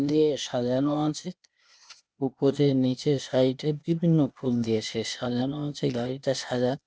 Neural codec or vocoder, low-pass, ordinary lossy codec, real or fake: codec, 16 kHz, 0.8 kbps, ZipCodec; none; none; fake